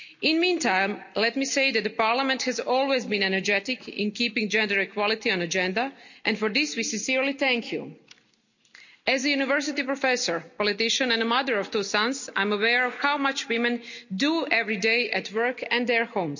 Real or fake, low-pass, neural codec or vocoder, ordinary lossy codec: real; 7.2 kHz; none; none